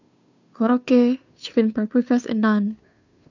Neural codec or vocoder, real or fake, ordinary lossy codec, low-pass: codec, 16 kHz, 2 kbps, FunCodec, trained on Chinese and English, 25 frames a second; fake; none; 7.2 kHz